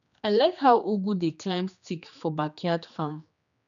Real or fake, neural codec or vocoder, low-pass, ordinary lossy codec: fake; codec, 16 kHz, 2 kbps, X-Codec, HuBERT features, trained on general audio; 7.2 kHz; none